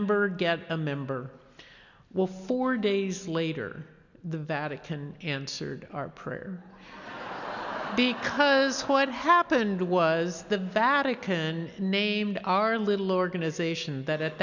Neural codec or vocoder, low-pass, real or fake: none; 7.2 kHz; real